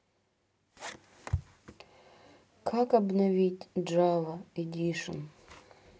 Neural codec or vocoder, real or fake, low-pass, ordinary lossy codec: none; real; none; none